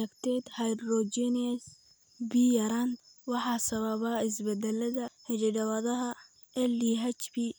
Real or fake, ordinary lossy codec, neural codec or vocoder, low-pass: real; none; none; none